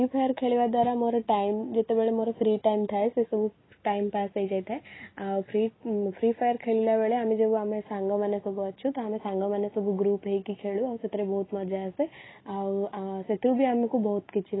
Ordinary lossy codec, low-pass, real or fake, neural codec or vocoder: AAC, 16 kbps; 7.2 kHz; real; none